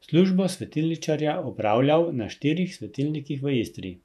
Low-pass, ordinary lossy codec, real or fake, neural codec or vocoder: 14.4 kHz; none; fake; vocoder, 48 kHz, 128 mel bands, Vocos